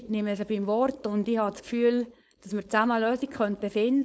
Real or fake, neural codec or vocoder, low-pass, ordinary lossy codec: fake; codec, 16 kHz, 4.8 kbps, FACodec; none; none